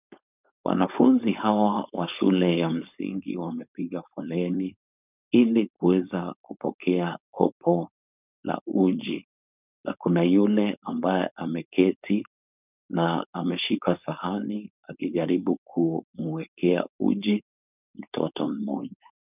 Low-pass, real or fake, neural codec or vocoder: 3.6 kHz; fake; codec, 16 kHz, 4.8 kbps, FACodec